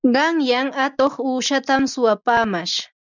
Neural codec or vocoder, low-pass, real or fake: none; 7.2 kHz; real